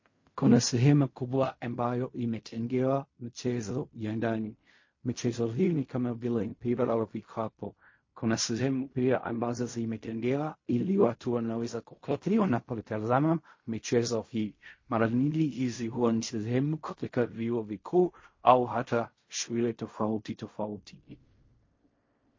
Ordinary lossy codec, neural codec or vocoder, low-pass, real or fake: MP3, 32 kbps; codec, 16 kHz in and 24 kHz out, 0.4 kbps, LongCat-Audio-Codec, fine tuned four codebook decoder; 7.2 kHz; fake